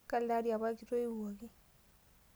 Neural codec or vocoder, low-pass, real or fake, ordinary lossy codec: none; none; real; none